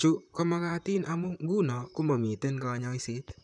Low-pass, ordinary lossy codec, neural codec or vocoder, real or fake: 10.8 kHz; none; vocoder, 44.1 kHz, 128 mel bands, Pupu-Vocoder; fake